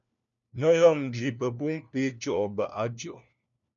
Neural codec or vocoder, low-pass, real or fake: codec, 16 kHz, 1 kbps, FunCodec, trained on LibriTTS, 50 frames a second; 7.2 kHz; fake